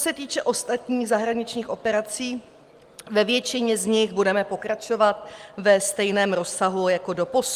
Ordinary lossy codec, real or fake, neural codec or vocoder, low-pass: Opus, 32 kbps; real; none; 14.4 kHz